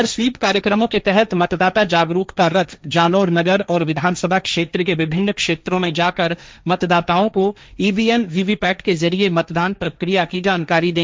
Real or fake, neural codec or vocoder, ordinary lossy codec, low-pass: fake; codec, 16 kHz, 1.1 kbps, Voila-Tokenizer; none; none